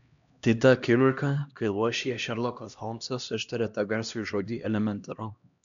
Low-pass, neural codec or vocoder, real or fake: 7.2 kHz; codec, 16 kHz, 1 kbps, X-Codec, HuBERT features, trained on LibriSpeech; fake